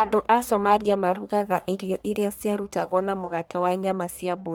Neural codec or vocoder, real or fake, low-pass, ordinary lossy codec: codec, 44.1 kHz, 1.7 kbps, Pupu-Codec; fake; none; none